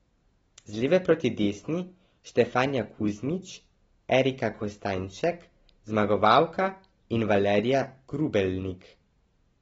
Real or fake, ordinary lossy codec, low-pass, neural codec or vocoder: real; AAC, 24 kbps; 19.8 kHz; none